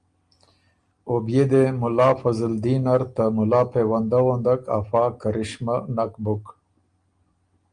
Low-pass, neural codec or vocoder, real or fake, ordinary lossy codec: 9.9 kHz; none; real; Opus, 32 kbps